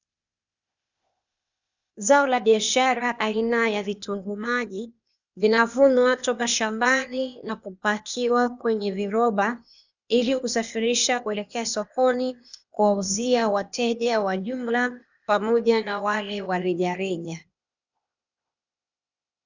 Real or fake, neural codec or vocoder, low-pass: fake; codec, 16 kHz, 0.8 kbps, ZipCodec; 7.2 kHz